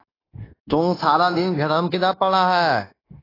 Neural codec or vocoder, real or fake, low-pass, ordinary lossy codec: codec, 16 kHz, 0.9 kbps, LongCat-Audio-Codec; fake; 5.4 kHz; AAC, 24 kbps